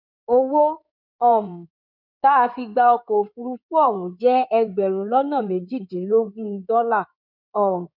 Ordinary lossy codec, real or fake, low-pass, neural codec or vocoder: none; fake; 5.4 kHz; codec, 16 kHz in and 24 kHz out, 2.2 kbps, FireRedTTS-2 codec